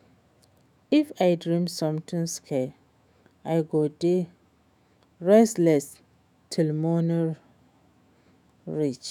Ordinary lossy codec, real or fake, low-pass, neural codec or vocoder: none; fake; none; autoencoder, 48 kHz, 128 numbers a frame, DAC-VAE, trained on Japanese speech